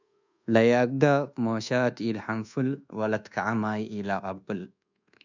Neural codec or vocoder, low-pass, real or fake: codec, 24 kHz, 1.2 kbps, DualCodec; 7.2 kHz; fake